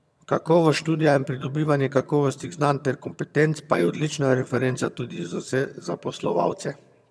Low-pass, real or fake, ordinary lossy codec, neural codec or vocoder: none; fake; none; vocoder, 22.05 kHz, 80 mel bands, HiFi-GAN